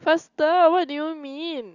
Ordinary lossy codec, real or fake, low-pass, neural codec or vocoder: Opus, 64 kbps; real; 7.2 kHz; none